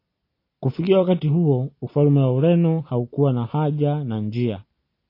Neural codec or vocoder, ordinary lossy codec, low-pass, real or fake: none; AAC, 32 kbps; 5.4 kHz; real